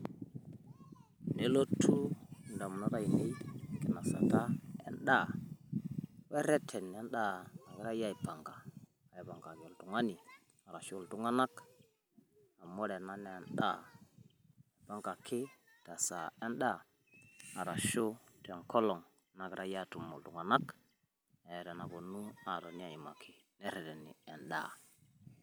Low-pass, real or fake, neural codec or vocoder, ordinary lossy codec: none; real; none; none